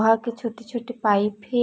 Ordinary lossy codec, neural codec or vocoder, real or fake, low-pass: none; none; real; none